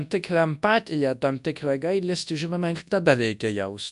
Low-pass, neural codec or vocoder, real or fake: 10.8 kHz; codec, 24 kHz, 0.9 kbps, WavTokenizer, large speech release; fake